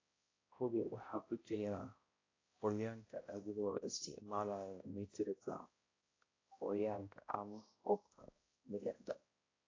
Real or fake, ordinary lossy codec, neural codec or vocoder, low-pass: fake; AAC, 32 kbps; codec, 16 kHz, 0.5 kbps, X-Codec, HuBERT features, trained on balanced general audio; 7.2 kHz